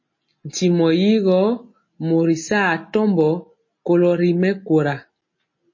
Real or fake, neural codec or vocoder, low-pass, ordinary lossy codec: real; none; 7.2 kHz; MP3, 32 kbps